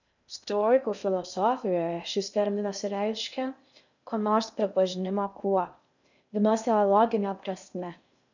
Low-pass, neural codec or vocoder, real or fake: 7.2 kHz; codec, 16 kHz in and 24 kHz out, 0.6 kbps, FocalCodec, streaming, 2048 codes; fake